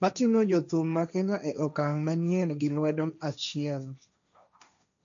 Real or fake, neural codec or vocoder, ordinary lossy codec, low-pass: fake; codec, 16 kHz, 1.1 kbps, Voila-Tokenizer; MP3, 96 kbps; 7.2 kHz